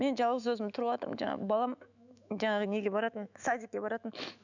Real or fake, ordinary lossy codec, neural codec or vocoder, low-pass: fake; none; autoencoder, 48 kHz, 128 numbers a frame, DAC-VAE, trained on Japanese speech; 7.2 kHz